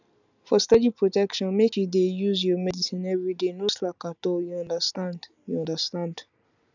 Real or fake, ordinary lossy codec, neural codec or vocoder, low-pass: real; none; none; 7.2 kHz